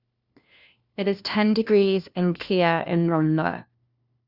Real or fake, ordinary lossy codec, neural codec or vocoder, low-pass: fake; Opus, 64 kbps; codec, 16 kHz, 1 kbps, FunCodec, trained on LibriTTS, 50 frames a second; 5.4 kHz